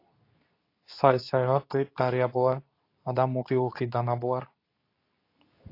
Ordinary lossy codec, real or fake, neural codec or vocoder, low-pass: AAC, 32 kbps; fake; codec, 24 kHz, 0.9 kbps, WavTokenizer, medium speech release version 2; 5.4 kHz